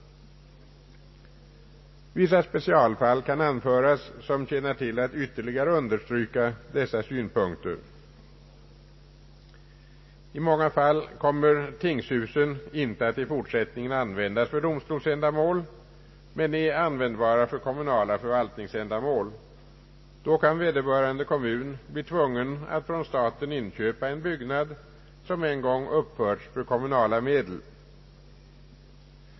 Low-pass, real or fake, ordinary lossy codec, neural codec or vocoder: 7.2 kHz; real; MP3, 24 kbps; none